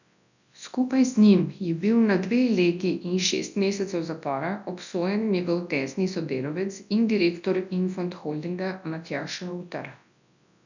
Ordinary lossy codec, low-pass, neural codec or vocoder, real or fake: none; 7.2 kHz; codec, 24 kHz, 0.9 kbps, WavTokenizer, large speech release; fake